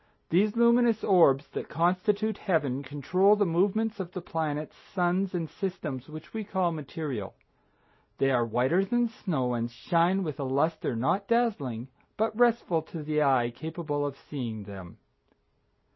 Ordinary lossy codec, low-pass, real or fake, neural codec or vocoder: MP3, 24 kbps; 7.2 kHz; real; none